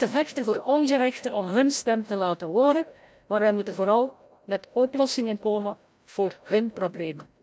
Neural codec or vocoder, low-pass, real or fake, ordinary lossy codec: codec, 16 kHz, 0.5 kbps, FreqCodec, larger model; none; fake; none